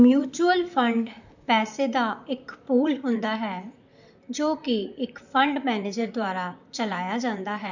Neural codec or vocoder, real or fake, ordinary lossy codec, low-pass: vocoder, 44.1 kHz, 128 mel bands, Pupu-Vocoder; fake; none; 7.2 kHz